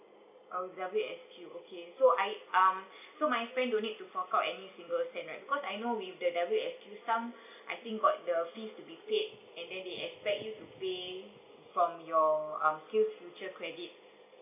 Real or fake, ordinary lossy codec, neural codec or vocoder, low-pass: real; none; none; 3.6 kHz